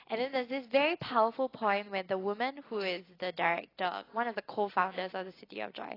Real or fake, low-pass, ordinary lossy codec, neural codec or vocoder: real; 5.4 kHz; AAC, 24 kbps; none